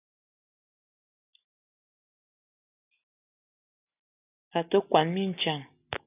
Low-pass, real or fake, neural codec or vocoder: 3.6 kHz; real; none